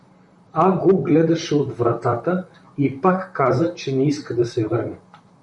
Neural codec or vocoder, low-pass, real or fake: vocoder, 44.1 kHz, 128 mel bands, Pupu-Vocoder; 10.8 kHz; fake